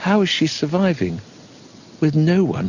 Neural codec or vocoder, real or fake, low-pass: none; real; 7.2 kHz